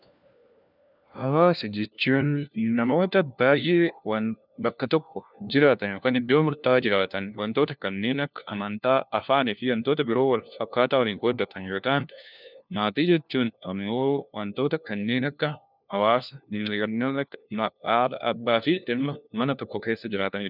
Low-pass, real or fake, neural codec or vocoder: 5.4 kHz; fake; codec, 16 kHz, 1 kbps, FunCodec, trained on LibriTTS, 50 frames a second